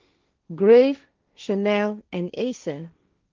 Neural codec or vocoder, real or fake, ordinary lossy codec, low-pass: codec, 16 kHz, 1.1 kbps, Voila-Tokenizer; fake; Opus, 24 kbps; 7.2 kHz